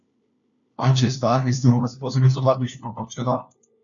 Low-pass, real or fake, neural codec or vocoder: 7.2 kHz; fake; codec, 16 kHz, 1 kbps, FunCodec, trained on LibriTTS, 50 frames a second